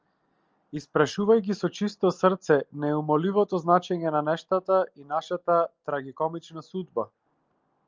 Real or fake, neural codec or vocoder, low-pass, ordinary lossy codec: real; none; 7.2 kHz; Opus, 24 kbps